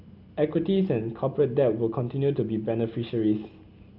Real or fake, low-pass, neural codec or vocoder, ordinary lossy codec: real; 5.4 kHz; none; Opus, 24 kbps